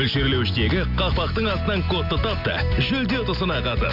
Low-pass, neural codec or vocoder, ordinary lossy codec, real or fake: 5.4 kHz; none; none; real